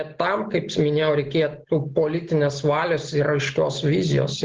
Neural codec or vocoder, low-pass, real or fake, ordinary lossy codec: none; 7.2 kHz; real; Opus, 16 kbps